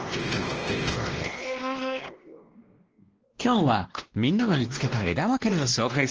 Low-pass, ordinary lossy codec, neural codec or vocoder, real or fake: 7.2 kHz; Opus, 16 kbps; codec, 16 kHz, 1 kbps, X-Codec, WavLM features, trained on Multilingual LibriSpeech; fake